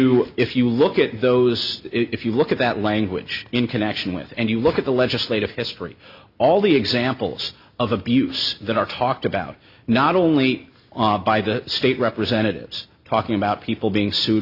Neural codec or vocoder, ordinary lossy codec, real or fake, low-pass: none; Opus, 64 kbps; real; 5.4 kHz